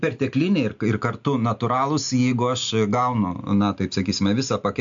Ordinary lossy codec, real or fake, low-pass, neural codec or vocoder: MP3, 64 kbps; real; 7.2 kHz; none